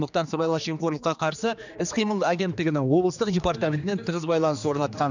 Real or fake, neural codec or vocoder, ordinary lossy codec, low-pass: fake; codec, 16 kHz, 2 kbps, X-Codec, HuBERT features, trained on general audio; none; 7.2 kHz